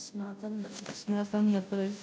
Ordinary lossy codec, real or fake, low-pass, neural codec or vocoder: none; fake; none; codec, 16 kHz, 0.5 kbps, FunCodec, trained on Chinese and English, 25 frames a second